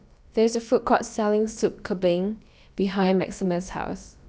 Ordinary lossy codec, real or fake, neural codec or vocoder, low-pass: none; fake; codec, 16 kHz, about 1 kbps, DyCAST, with the encoder's durations; none